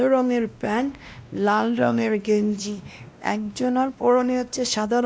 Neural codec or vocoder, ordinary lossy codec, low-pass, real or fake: codec, 16 kHz, 1 kbps, X-Codec, WavLM features, trained on Multilingual LibriSpeech; none; none; fake